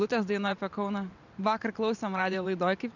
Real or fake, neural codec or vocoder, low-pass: fake; vocoder, 44.1 kHz, 128 mel bands every 512 samples, BigVGAN v2; 7.2 kHz